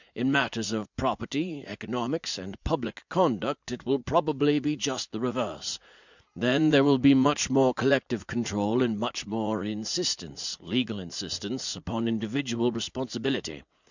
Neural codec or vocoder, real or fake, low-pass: vocoder, 44.1 kHz, 80 mel bands, Vocos; fake; 7.2 kHz